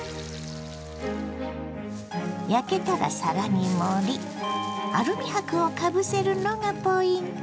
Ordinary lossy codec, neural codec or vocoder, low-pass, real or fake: none; none; none; real